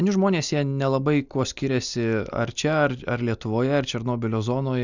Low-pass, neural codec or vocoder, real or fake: 7.2 kHz; none; real